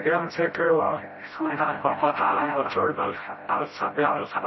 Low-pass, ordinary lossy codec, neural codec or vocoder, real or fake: 7.2 kHz; MP3, 24 kbps; codec, 16 kHz, 0.5 kbps, FreqCodec, smaller model; fake